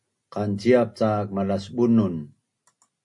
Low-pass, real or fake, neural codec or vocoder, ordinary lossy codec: 10.8 kHz; real; none; MP3, 48 kbps